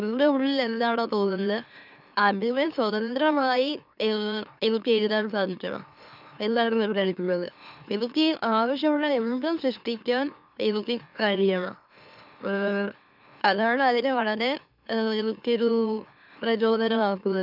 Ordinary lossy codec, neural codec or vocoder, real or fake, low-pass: none; autoencoder, 44.1 kHz, a latent of 192 numbers a frame, MeloTTS; fake; 5.4 kHz